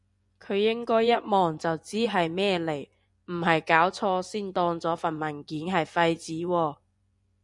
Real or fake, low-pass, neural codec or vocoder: fake; 10.8 kHz; vocoder, 24 kHz, 100 mel bands, Vocos